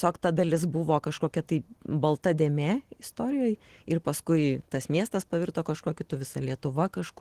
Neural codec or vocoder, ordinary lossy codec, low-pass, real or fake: none; Opus, 16 kbps; 14.4 kHz; real